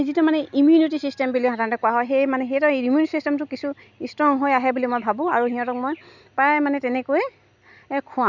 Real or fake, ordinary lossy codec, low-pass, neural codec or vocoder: real; none; 7.2 kHz; none